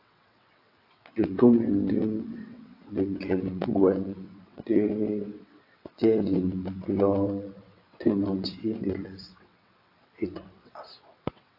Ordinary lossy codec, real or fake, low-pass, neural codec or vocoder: AAC, 32 kbps; fake; 5.4 kHz; vocoder, 22.05 kHz, 80 mel bands, WaveNeXt